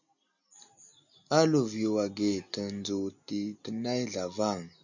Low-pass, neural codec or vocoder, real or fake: 7.2 kHz; none; real